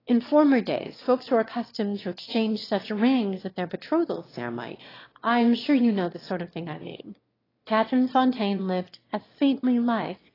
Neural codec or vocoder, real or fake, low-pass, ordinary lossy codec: autoencoder, 22.05 kHz, a latent of 192 numbers a frame, VITS, trained on one speaker; fake; 5.4 kHz; AAC, 24 kbps